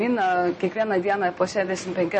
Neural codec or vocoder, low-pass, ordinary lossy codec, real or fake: none; 10.8 kHz; MP3, 32 kbps; real